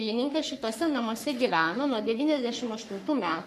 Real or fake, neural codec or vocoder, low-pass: fake; codec, 44.1 kHz, 3.4 kbps, Pupu-Codec; 14.4 kHz